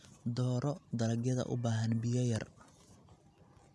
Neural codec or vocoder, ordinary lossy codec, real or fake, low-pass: none; none; real; none